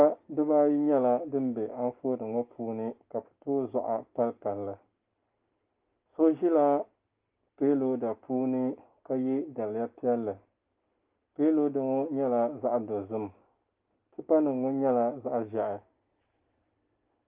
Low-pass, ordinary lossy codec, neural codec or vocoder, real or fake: 3.6 kHz; Opus, 24 kbps; none; real